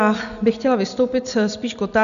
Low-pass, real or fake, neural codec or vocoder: 7.2 kHz; real; none